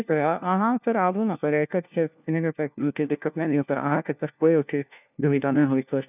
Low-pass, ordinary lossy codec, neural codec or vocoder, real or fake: 3.6 kHz; none; codec, 16 kHz, 1 kbps, FunCodec, trained on LibriTTS, 50 frames a second; fake